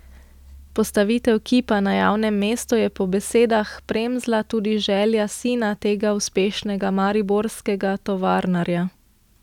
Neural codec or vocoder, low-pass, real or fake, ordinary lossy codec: none; 19.8 kHz; real; none